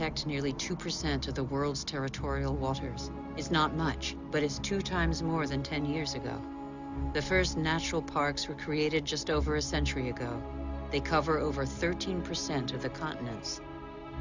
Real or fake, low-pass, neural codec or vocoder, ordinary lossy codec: real; 7.2 kHz; none; Opus, 64 kbps